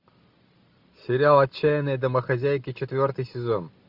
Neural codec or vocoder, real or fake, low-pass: none; real; 5.4 kHz